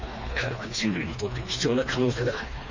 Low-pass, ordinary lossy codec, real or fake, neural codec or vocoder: 7.2 kHz; MP3, 32 kbps; fake; codec, 16 kHz, 2 kbps, FreqCodec, smaller model